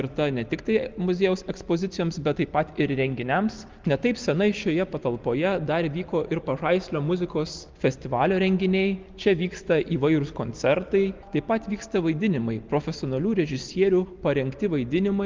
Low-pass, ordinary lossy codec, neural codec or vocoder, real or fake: 7.2 kHz; Opus, 32 kbps; none; real